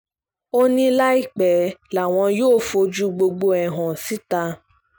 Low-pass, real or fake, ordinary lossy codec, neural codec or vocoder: none; real; none; none